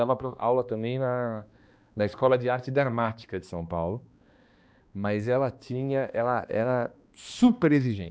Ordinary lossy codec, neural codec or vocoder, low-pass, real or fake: none; codec, 16 kHz, 2 kbps, X-Codec, HuBERT features, trained on balanced general audio; none; fake